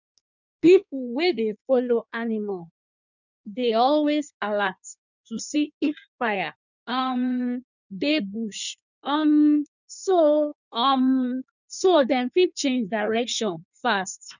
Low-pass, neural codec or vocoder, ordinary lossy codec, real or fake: 7.2 kHz; codec, 16 kHz in and 24 kHz out, 1.1 kbps, FireRedTTS-2 codec; none; fake